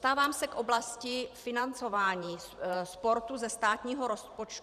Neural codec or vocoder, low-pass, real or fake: vocoder, 44.1 kHz, 128 mel bands every 512 samples, BigVGAN v2; 14.4 kHz; fake